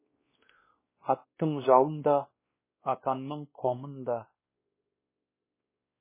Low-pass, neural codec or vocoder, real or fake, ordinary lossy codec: 3.6 kHz; codec, 16 kHz, 1 kbps, X-Codec, WavLM features, trained on Multilingual LibriSpeech; fake; MP3, 16 kbps